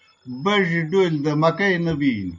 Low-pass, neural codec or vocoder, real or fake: 7.2 kHz; none; real